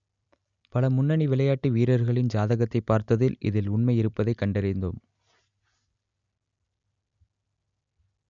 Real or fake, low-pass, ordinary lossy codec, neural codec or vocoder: real; 7.2 kHz; none; none